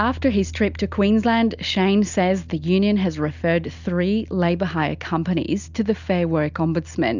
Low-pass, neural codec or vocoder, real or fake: 7.2 kHz; none; real